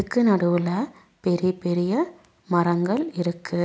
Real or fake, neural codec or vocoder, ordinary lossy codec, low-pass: real; none; none; none